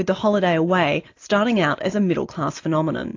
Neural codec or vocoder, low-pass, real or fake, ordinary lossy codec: none; 7.2 kHz; real; AAC, 48 kbps